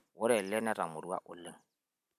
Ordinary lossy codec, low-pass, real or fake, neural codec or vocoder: none; 14.4 kHz; real; none